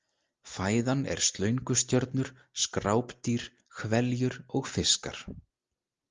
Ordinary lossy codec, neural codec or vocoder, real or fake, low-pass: Opus, 32 kbps; none; real; 7.2 kHz